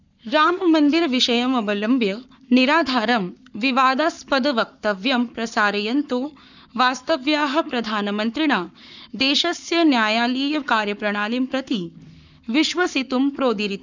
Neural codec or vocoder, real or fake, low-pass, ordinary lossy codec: codec, 44.1 kHz, 7.8 kbps, Pupu-Codec; fake; 7.2 kHz; none